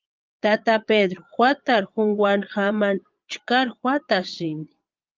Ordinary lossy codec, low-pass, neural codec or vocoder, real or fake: Opus, 24 kbps; 7.2 kHz; vocoder, 22.05 kHz, 80 mel bands, Vocos; fake